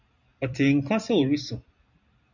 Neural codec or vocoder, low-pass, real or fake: vocoder, 44.1 kHz, 128 mel bands every 256 samples, BigVGAN v2; 7.2 kHz; fake